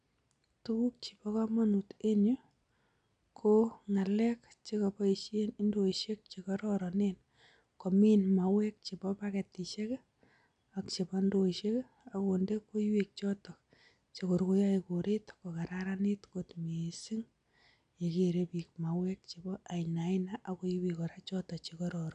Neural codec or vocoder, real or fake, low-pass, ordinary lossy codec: none; real; 9.9 kHz; none